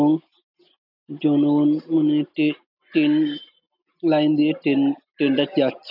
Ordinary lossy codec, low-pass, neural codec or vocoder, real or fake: none; 5.4 kHz; none; real